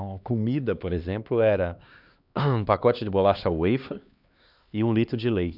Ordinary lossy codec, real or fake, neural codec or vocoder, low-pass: none; fake; codec, 16 kHz, 2 kbps, X-Codec, HuBERT features, trained on LibriSpeech; 5.4 kHz